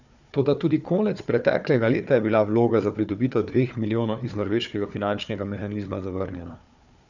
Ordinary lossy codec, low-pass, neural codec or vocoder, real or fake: none; 7.2 kHz; codec, 16 kHz, 4 kbps, FunCodec, trained on Chinese and English, 50 frames a second; fake